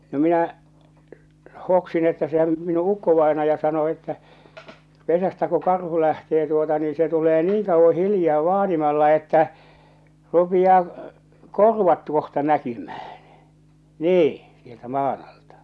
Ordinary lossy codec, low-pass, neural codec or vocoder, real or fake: none; none; none; real